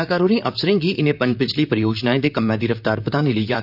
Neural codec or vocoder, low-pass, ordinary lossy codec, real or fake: vocoder, 44.1 kHz, 128 mel bands, Pupu-Vocoder; 5.4 kHz; none; fake